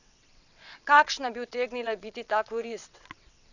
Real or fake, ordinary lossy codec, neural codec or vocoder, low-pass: fake; none; vocoder, 22.05 kHz, 80 mel bands, WaveNeXt; 7.2 kHz